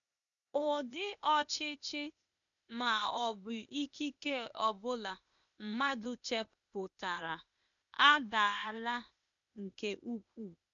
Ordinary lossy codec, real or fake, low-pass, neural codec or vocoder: none; fake; 7.2 kHz; codec, 16 kHz, 0.8 kbps, ZipCodec